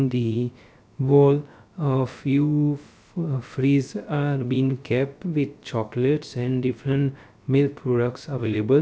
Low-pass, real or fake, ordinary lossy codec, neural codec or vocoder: none; fake; none; codec, 16 kHz, 0.3 kbps, FocalCodec